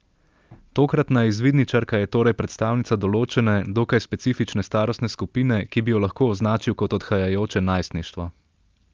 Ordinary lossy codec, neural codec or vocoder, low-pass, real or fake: Opus, 32 kbps; none; 7.2 kHz; real